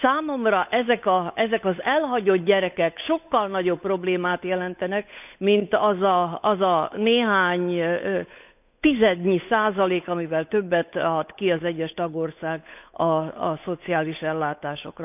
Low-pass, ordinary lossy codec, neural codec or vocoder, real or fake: 3.6 kHz; none; codec, 16 kHz, 8 kbps, FunCodec, trained on Chinese and English, 25 frames a second; fake